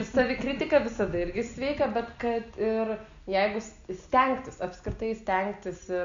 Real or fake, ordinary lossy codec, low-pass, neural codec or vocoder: real; Opus, 64 kbps; 7.2 kHz; none